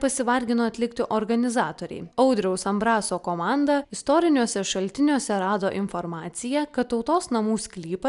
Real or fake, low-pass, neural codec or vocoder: real; 10.8 kHz; none